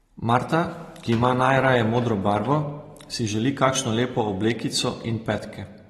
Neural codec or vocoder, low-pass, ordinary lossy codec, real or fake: none; 19.8 kHz; AAC, 32 kbps; real